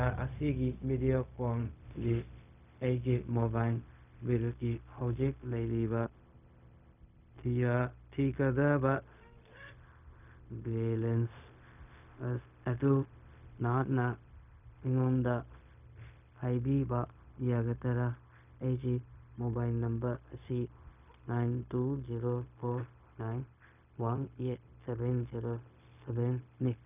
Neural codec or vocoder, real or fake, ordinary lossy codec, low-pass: codec, 16 kHz, 0.4 kbps, LongCat-Audio-Codec; fake; none; 3.6 kHz